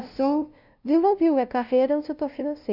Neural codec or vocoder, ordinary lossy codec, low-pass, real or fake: codec, 16 kHz, 0.5 kbps, FunCodec, trained on LibriTTS, 25 frames a second; none; 5.4 kHz; fake